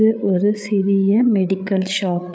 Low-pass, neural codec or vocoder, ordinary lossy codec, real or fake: none; codec, 16 kHz, 8 kbps, FreqCodec, larger model; none; fake